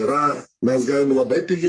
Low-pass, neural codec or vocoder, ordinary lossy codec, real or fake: 9.9 kHz; codec, 44.1 kHz, 3.4 kbps, Pupu-Codec; MP3, 48 kbps; fake